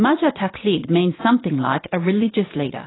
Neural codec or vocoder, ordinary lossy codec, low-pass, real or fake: vocoder, 22.05 kHz, 80 mel bands, Vocos; AAC, 16 kbps; 7.2 kHz; fake